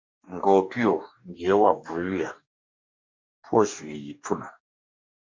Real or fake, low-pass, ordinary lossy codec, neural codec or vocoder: fake; 7.2 kHz; MP3, 48 kbps; codec, 44.1 kHz, 2.6 kbps, DAC